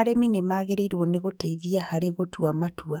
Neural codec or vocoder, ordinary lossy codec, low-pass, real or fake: codec, 44.1 kHz, 2.6 kbps, SNAC; none; none; fake